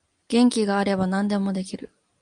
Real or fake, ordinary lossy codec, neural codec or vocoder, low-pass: real; Opus, 32 kbps; none; 9.9 kHz